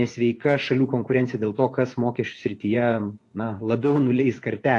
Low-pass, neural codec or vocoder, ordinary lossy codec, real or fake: 10.8 kHz; vocoder, 24 kHz, 100 mel bands, Vocos; AAC, 48 kbps; fake